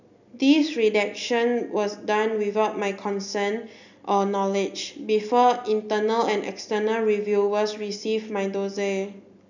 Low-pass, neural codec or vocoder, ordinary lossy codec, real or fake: 7.2 kHz; none; none; real